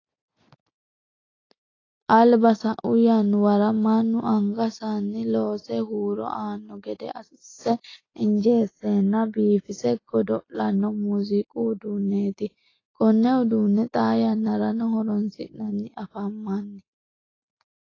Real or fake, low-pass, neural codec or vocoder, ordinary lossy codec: real; 7.2 kHz; none; AAC, 32 kbps